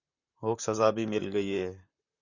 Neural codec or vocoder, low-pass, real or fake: vocoder, 44.1 kHz, 128 mel bands, Pupu-Vocoder; 7.2 kHz; fake